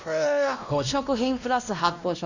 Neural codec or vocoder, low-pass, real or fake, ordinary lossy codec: codec, 16 kHz, 1 kbps, X-Codec, WavLM features, trained on Multilingual LibriSpeech; 7.2 kHz; fake; none